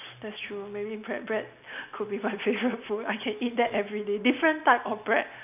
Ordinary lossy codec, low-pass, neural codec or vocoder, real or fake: none; 3.6 kHz; none; real